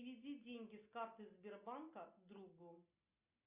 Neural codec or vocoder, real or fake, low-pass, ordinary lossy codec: none; real; 3.6 kHz; Opus, 64 kbps